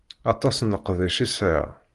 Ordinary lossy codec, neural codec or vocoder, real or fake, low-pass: Opus, 24 kbps; none; real; 10.8 kHz